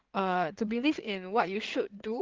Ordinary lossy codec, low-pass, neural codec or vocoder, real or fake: Opus, 16 kbps; 7.2 kHz; codec, 16 kHz in and 24 kHz out, 2.2 kbps, FireRedTTS-2 codec; fake